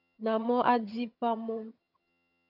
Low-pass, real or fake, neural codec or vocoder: 5.4 kHz; fake; vocoder, 22.05 kHz, 80 mel bands, HiFi-GAN